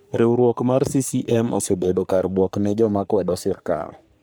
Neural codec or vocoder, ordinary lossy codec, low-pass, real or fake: codec, 44.1 kHz, 3.4 kbps, Pupu-Codec; none; none; fake